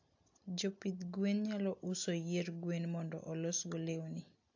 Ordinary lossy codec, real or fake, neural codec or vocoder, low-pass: AAC, 48 kbps; real; none; 7.2 kHz